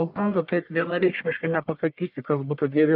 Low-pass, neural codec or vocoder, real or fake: 5.4 kHz; codec, 44.1 kHz, 1.7 kbps, Pupu-Codec; fake